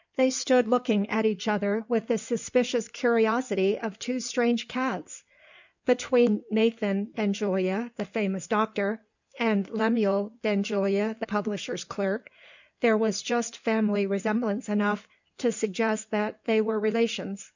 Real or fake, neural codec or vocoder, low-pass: fake; codec, 16 kHz in and 24 kHz out, 2.2 kbps, FireRedTTS-2 codec; 7.2 kHz